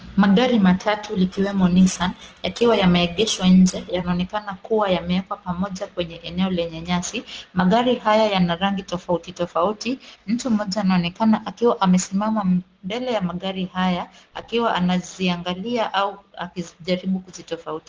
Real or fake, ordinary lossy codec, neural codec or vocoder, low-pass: real; Opus, 16 kbps; none; 7.2 kHz